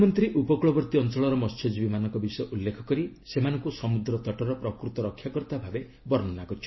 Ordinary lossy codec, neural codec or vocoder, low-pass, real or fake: MP3, 24 kbps; none; 7.2 kHz; real